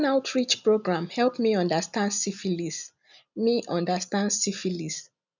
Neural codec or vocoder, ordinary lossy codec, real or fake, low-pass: none; none; real; 7.2 kHz